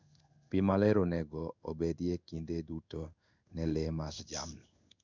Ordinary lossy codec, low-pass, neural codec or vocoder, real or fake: none; 7.2 kHz; codec, 16 kHz in and 24 kHz out, 1 kbps, XY-Tokenizer; fake